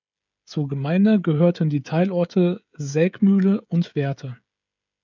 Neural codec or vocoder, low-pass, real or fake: codec, 16 kHz, 16 kbps, FreqCodec, smaller model; 7.2 kHz; fake